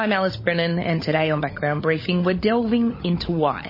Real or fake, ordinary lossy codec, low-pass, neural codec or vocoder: fake; MP3, 24 kbps; 5.4 kHz; codec, 16 kHz, 16 kbps, FunCodec, trained on LibriTTS, 50 frames a second